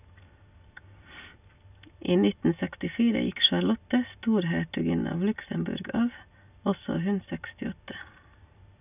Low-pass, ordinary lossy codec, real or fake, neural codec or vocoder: 3.6 kHz; none; real; none